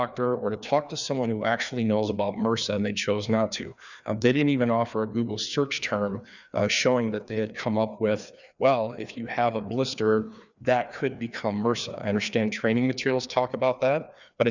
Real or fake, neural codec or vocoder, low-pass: fake; codec, 16 kHz, 2 kbps, FreqCodec, larger model; 7.2 kHz